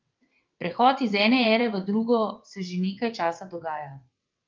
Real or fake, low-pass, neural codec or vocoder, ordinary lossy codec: fake; 7.2 kHz; vocoder, 22.05 kHz, 80 mel bands, WaveNeXt; Opus, 32 kbps